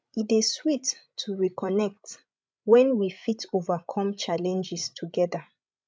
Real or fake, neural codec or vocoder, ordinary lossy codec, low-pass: fake; codec, 16 kHz, 16 kbps, FreqCodec, larger model; none; none